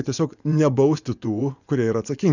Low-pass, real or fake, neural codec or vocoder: 7.2 kHz; fake; vocoder, 24 kHz, 100 mel bands, Vocos